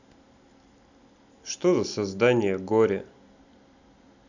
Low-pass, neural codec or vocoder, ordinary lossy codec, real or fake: 7.2 kHz; none; none; real